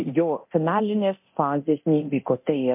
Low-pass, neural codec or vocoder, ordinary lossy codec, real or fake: 3.6 kHz; codec, 24 kHz, 0.9 kbps, DualCodec; MP3, 32 kbps; fake